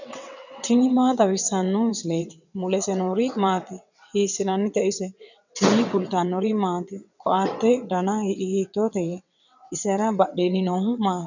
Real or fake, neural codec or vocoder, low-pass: fake; vocoder, 22.05 kHz, 80 mel bands, WaveNeXt; 7.2 kHz